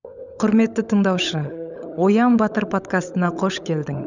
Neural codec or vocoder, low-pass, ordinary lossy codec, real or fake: codec, 16 kHz, 16 kbps, FunCodec, trained on LibriTTS, 50 frames a second; 7.2 kHz; none; fake